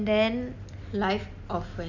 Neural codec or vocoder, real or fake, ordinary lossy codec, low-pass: none; real; none; 7.2 kHz